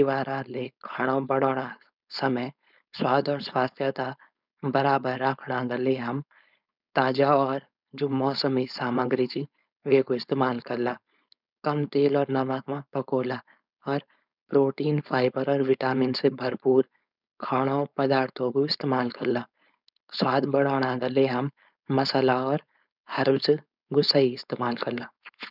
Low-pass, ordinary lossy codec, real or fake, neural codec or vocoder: 5.4 kHz; none; fake; codec, 16 kHz, 4.8 kbps, FACodec